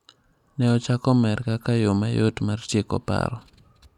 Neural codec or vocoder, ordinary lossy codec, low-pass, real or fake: none; none; 19.8 kHz; real